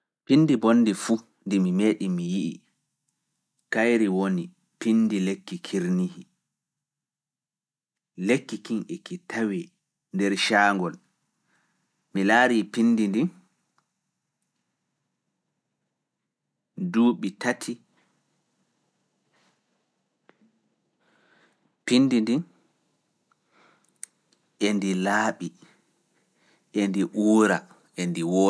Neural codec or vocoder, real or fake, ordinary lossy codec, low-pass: none; real; none; none